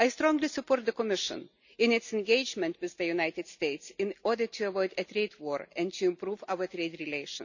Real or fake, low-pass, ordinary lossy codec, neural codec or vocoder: real; 7.2 kHz; none; none